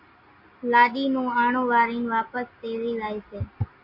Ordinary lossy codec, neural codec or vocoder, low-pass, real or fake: MP3, 32 kbps; none; 5.4 kHz; real